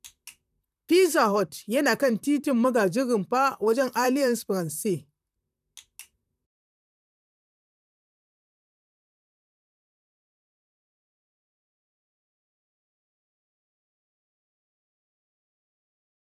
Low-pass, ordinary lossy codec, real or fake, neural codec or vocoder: 14.4 kHz; none; fake; vocoder, 44.1 kHz, 128 mel bands, Pupu-Vocoder